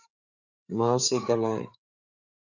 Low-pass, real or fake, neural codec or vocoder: 7.2 kHz; fake; codec, 16 kHz, 4 kbps, FreqCodec, larger model